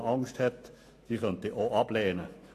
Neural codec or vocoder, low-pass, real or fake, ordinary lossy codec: autoencoder, 48 kHz, 128 numbers a frame, DAC-VAE, trained on Japanese speech; 14.4 kHz; fake; AAC, 48 kbps